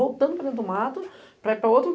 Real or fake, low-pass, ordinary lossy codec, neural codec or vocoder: real; none; none; none